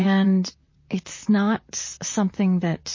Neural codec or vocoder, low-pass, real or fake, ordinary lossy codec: vocoder, 44.1 kHz, 128 mel bands, Pupu-Vocoder; 7.2 kHz; fake; MP3, 32 kbps